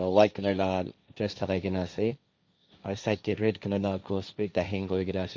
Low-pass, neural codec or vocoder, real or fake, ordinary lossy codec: none; codec, 16 kHz, 1.1 kbps, Voila-Tokenizer; fake; none